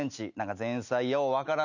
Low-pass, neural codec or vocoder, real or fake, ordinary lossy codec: 7.2 kHz; none; real; none